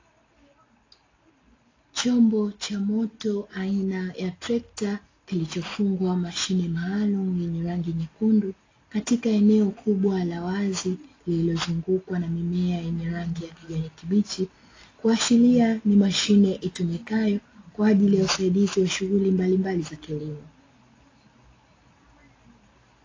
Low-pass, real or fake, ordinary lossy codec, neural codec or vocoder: 7.2 kHz; real; AAC, 32 kbps; none